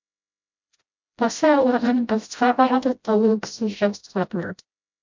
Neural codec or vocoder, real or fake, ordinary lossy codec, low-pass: codec, 16 kHz, 0.5 kbps, FreqCodec, smaller model; fake; MP3, 64 kbps; 7.2 kHz